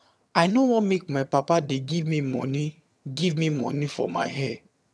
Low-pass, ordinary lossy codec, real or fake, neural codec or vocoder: none; none; fake; vocoder, 22.05 kHz, 80 mel bands, HiFi-GAN